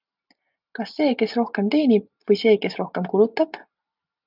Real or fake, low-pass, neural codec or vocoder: real; 5.4 kHz; none